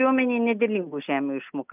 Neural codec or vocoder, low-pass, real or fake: none; 3.6 kHz; real